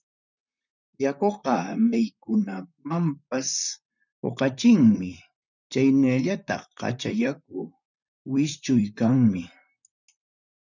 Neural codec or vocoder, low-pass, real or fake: vocoder, 44.1 kHz, 128 mel bands, Pupu-Vocoder; 7.2 kHz; fake